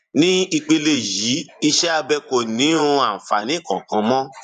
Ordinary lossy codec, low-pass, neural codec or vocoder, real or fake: none; 10.8 kHz; vocoder, 24 kHz, 100 mel bands, Vocos; fake